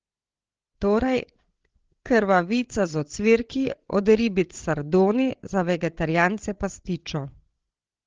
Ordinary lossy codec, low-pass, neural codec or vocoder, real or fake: Opus, 16 kbps; 7.2 kHz; codec, 16 kHz, 8 kbps, FreqCodec, larger model; fake